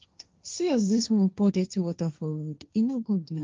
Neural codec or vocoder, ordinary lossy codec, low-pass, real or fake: codec, 16 kHz, 1.1 kbps, Voila-Tokenizer; Opus, 24 kbps; 7.2 kHz; fake